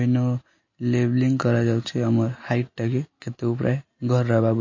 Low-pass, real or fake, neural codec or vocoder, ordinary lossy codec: 7.2 kHz; real; none; MP3, 32 kbps